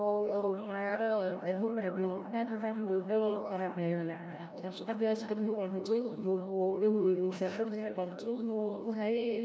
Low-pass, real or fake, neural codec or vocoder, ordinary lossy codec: none; fake; codec, 16 kHz, 0.5 kbps, FreqCodec, larger model; none